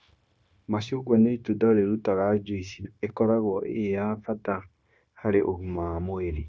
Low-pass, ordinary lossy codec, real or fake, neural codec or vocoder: none; none; fake; codec, 16 kHz, 0.9 kbps, LongCat-Audio-Codec